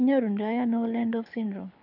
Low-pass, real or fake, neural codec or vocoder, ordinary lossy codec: 5.4 kHz; fake; codec, 24 kHz, 6 kbps, HILCodec; none